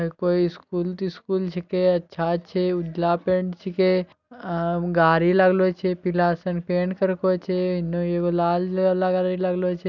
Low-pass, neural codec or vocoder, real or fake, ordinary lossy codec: 7.2 kHz; none; real; none